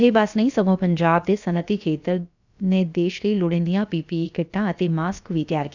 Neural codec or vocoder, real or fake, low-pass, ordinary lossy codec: codec, 16 kHz, about 1 kbps, DyCAST, with the encoder's durations; fake; 7.2 kHz; none